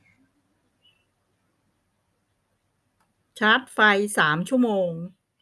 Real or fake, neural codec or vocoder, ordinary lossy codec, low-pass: real; none; none; none